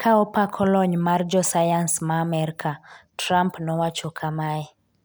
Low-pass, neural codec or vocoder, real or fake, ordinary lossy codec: none; none; real; none